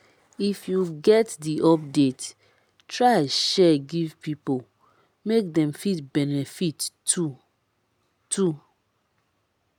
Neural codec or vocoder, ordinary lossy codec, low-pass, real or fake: none; none; none; real